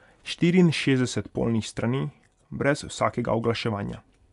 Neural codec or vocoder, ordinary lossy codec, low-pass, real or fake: none; none; 10.8 kHz; real